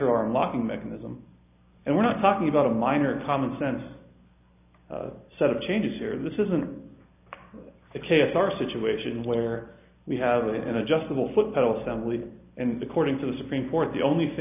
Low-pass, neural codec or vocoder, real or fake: 3.6 kHz; none; real